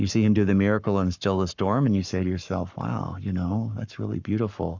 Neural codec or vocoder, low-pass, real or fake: codec, 44.1 kHz, 7.8 kbps, Pupu-Codec; 7.2 kHz; fake